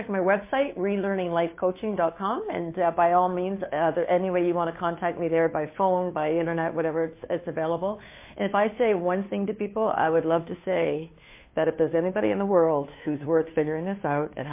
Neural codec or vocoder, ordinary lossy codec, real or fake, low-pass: codec, 16 kHz, 2 kbps, FunCodec, trained on Chinese and English, 25 frames a second; MP3, 24 kbps; fake; 3.6 kHz